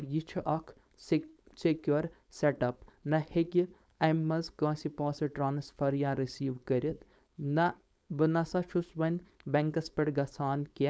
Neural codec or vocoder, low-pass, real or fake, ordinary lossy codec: codec, 16 kHz, 4.8 kbps, FACodec; none; fake; none